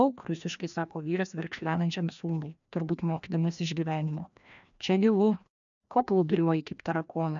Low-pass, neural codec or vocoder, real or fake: 7.2 kHz; codec, 16 kHz, 1 kbps, FreqCodec, larger model; fake